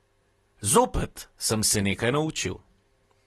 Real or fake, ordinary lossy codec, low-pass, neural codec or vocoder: real; AAC, 32 kbps; 19.8 kHz; none